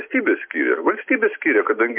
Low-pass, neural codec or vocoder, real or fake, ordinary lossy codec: 3.6 kHz; none; real; MP3, 32 kbps